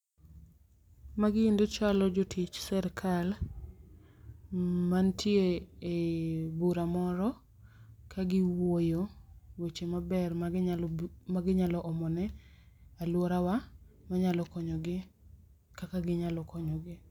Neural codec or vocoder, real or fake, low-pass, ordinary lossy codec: none; real; 19.8 kHz; none